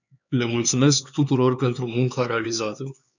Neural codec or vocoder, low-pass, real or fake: codec, 16 kHz, 4 kbps, X-Codec, HuBERT features, trained on LibriSpeech; 7.2 kHz; fake